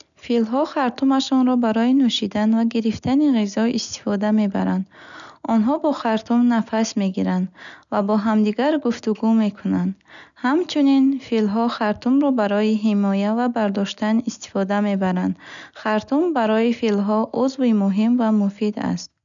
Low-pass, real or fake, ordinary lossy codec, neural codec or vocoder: 7.2 kHz; real; none; none